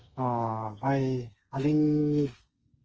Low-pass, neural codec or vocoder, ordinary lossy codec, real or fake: 7.2 kHz; codec, 32 kHz, 1.9 kbps, SNAC; Opus, 24 kbps; fake